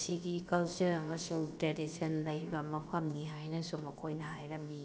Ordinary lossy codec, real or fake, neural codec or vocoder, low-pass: none; fake; codec, 16 kHz, about 1 kbps, DyCAST, with the encoder's durations; none